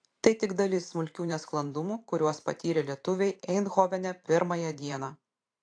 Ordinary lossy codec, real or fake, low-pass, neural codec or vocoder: AAC, 48 kbps; fake; 9.9 kHz; vocoder, 44.1 kHz, 128 mel bands every 512 samples, BigVGAN v2